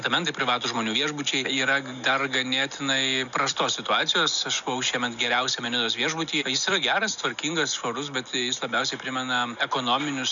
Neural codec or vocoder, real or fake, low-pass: none; real; 7.2 kHz